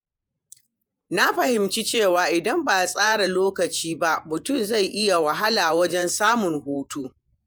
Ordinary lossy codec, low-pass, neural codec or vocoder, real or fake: none; none; vocoder, 48 kHz, 128 mel bands, Vocos; fake